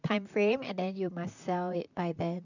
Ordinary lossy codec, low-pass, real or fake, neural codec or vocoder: none; 7.2 kHz; fake; vocoder, 44.1 kHz, 128 mel bands, Pupu-Vocoder